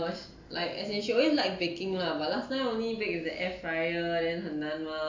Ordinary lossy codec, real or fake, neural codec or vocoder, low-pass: AAC, 48 kbps; real; none; 7.2 kHz